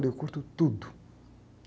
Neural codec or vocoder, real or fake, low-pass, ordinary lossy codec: none; real; none; none